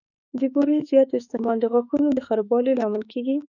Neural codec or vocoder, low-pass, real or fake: autoencoder, 48 kHz, 32 numbers a frame, DAC-VAE, trained on Japanese speech; 7.2 kHz; fake